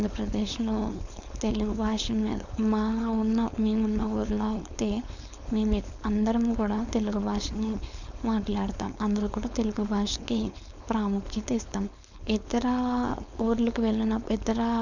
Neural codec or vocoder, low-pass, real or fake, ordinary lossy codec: codec, 16 kHz, 4.8 kbps, FACodec; 7.2 kHz; fake; none